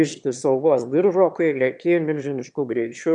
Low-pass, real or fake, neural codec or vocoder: 9.9 kHz; fake; autoencoder, 22.05 kHz, a latent of 192 numbers a frame, VITS, trained on one speaker